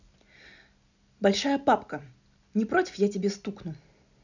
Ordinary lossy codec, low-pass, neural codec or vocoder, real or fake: none; 7.2 kHz; none; real